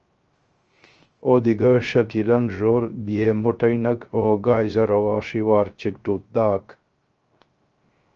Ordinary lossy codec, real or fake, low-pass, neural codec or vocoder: Opus, 24 kbps; fake; 7.2 kHz; codec, 16 kHz, 0.3 kbps, FocalCodec